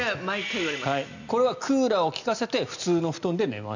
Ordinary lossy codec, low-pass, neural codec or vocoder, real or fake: none; 7.2 kHz; none; real